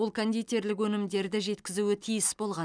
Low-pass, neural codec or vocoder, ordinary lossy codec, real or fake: 9.9 kHz; none; none; real